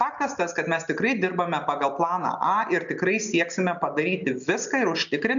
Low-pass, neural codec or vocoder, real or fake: 7.2 kHz; none; real